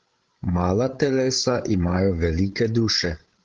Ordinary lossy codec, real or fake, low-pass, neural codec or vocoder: Opus, 16 kbps; fake; 7.2 kHz; codec, 16 kHz, 16 kbps, FreqCodec, larger model